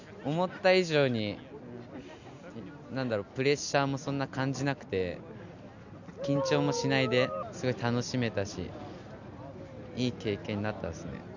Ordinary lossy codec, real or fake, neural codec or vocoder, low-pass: none; real; none; 7.2 kHz